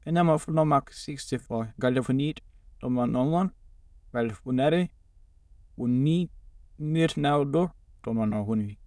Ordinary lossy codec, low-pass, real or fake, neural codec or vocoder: none; none; fake; autoencoder, 22.05 kHz, a latent of 192 numbers a frame, VITS, trained on many speakers